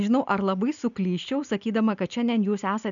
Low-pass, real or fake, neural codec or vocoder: 7.2 kHz; real; none